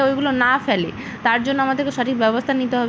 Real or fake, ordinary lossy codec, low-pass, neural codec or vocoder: real; none; none; none